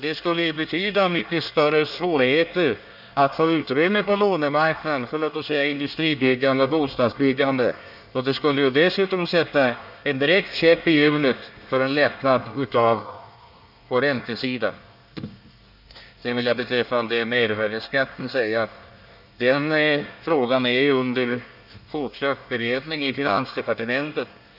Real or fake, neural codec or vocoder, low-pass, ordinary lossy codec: fake; codec, 24 kHz, 1 kbps, SNAC; 5.4 kHz; none